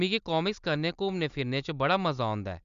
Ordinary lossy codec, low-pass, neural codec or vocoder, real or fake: Opus, 64 kbps; 7.2 kHz; none; real